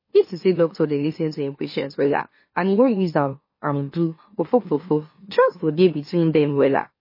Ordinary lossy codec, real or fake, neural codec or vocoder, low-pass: MP3, 24 kbps; fake; autoencoder, 44.1 kHz, a latent of 192 numbers a frame, MeloTTS; 5.4 kHz